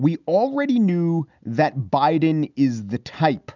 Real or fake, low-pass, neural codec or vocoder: real; 7.2 kHz; none